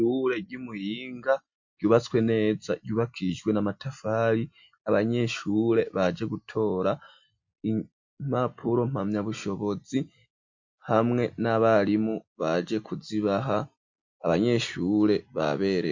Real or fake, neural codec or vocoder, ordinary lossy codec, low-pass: real; none; MP3, 48 kbps; 7.2 kHz